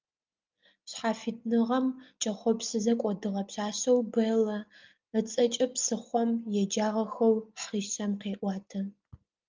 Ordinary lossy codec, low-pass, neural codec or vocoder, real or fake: Opus, 24 kbps; 7.2 kHz; none; real